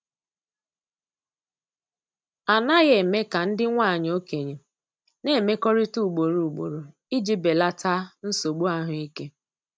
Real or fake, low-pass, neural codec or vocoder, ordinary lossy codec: real; none; none; none